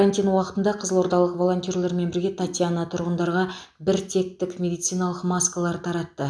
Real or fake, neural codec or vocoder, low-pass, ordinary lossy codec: real; none; none; none